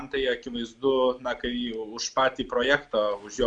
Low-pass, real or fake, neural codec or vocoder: 9.9 kHz; real; none